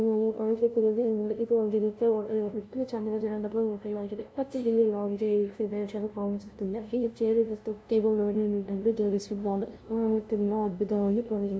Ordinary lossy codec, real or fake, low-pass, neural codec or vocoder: none; fake; none; codec, 16 kHz, 0.5 kbps, FunCodec, trained on LibriTTS, 25 frames a second